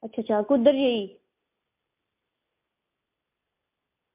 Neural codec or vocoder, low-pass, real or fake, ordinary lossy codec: none; 3.6 kHz; real; MP3, 32 kbps